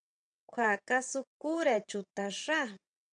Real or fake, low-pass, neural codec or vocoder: fake; 9.9 kHz; vocoder, 22.05 kHz, 80 mel bands, WaveNeXt